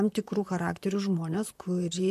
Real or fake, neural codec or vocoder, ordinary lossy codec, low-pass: fake; vocoder, 44.1 kHz, 128 mel bands, Pupu-Vocoder; MP3, 64 kbps; 14.4 kHz